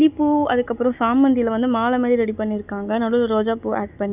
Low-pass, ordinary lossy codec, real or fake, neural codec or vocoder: 3.6 kHz; none; real; none